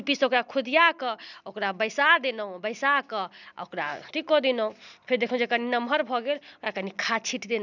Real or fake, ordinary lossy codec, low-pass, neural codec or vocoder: real; none; 7.2 kHz; none